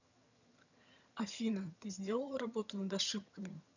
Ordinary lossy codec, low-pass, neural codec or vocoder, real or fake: none; 7.2 kHz; vocoder, 22.05 kHz, 80 mel bands, HiFi-GAN; fake